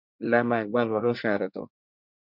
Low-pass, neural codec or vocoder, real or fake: 5.4 kHz; codec, 24 kHz, 1 kbps, SNAC; fake